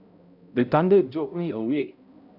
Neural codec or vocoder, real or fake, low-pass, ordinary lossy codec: codec, 16 kHz, 0.5 kbps, X-Codec, HuBERT features, trained on balanced general audio; fake; 5.4 kHz; none